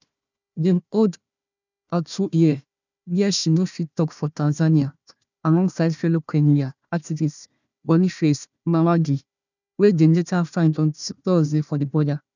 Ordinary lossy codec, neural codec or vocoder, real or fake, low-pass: none; codec, 16 kHz, 1 kbps, FunCodec, trained on Chinese and English, 50 frames a second; fake; 7.2 kHz